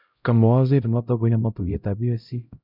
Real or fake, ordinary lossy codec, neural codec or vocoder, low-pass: fake; none; codec, 16 kHz, 0.5 kbps, X-Codec, HuBERT features, trained on LibriSpeech; 5.4 kHz